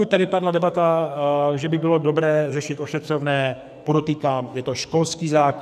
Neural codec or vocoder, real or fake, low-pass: codec, 44.1 kHz, 2.6 kbps, SNAC; fake; 14.4 kHz